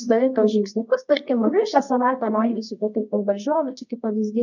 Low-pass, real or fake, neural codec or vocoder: 7.2 kHz; fake; codec, 24 kHz, 0.9 kbps, WavTokenizer, medium music audio release